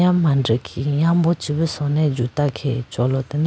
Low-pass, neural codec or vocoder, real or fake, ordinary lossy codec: none; none; real; none